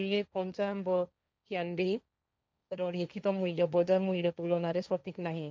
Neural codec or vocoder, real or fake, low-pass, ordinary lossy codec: codec, 16 kHz, 1.1 kbps, Voila-Tokenizer; fake; none; none